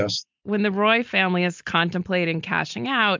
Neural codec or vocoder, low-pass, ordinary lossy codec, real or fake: none; 7.2 kHz; MP3, 64 kbps; real